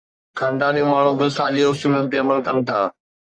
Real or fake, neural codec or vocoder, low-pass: fake; codec, 44.1 kHz, 1.7 kbps, Pupu-Codec; 9.9 kHz